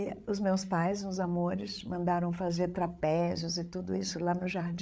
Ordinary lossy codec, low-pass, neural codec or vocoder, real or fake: none; none; codec, 16 kHz, 8 kbps, FreqCodec, larger model; fake